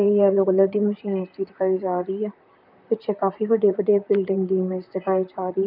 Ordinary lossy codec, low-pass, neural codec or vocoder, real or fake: none; 5.4 kHz; vocoder, 44.1 kHz, 128 mel bands, Pupu-Vocoder; fake